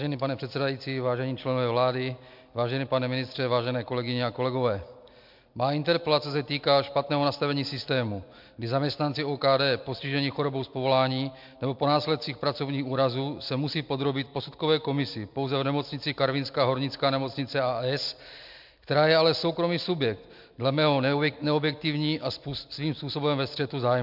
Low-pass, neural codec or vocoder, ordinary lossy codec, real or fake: 5.4 kHz; none; MP3, 48 kbps; real